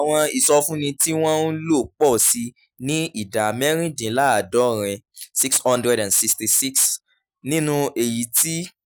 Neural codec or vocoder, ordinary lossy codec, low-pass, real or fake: none; none; none; real